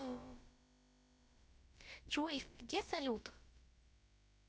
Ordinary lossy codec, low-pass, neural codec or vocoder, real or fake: none; none; codec, 16 kHz, about 1 kbps, DyCAST, with the encoder's durations; fake